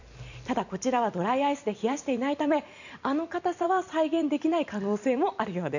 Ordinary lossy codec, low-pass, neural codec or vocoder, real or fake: none; 7.2 kHz; vocoder, 44.1 kHz, 128 mel bands every 256 samples, BigVGAN v2; fake